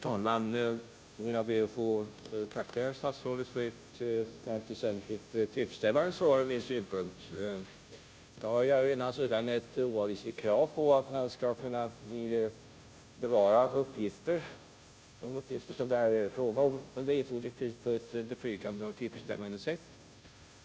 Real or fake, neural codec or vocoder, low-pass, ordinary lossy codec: fake; codec, 16 kHz, 0.5 kbps, FunCodec, trained on Chinese and English, 25 frames a second; none; none